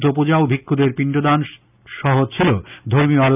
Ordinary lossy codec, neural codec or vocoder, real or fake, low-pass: none; none; real; 3.6 kHz